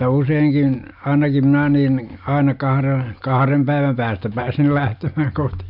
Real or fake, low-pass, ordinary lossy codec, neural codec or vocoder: real; 5.4 kHz; none; none